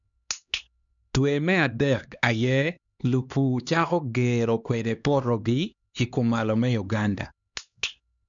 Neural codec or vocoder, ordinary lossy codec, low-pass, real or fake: codec, 16 kHz, 2 kbps, X-Codec, HuBERT features, trained on LibriSpeech; none; 7.2 kHz; fake